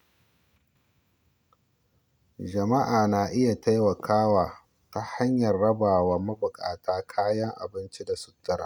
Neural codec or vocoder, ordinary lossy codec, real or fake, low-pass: none; none; real; none